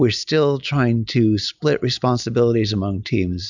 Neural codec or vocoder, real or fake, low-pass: none; real; 7.2 kHz